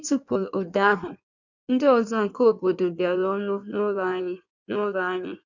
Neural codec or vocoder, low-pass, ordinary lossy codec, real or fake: codec, 16 kHz in and 24 kHz out, 1.1 kbps, FireRedTTS-2 codec; 7.2 kHz; none; fake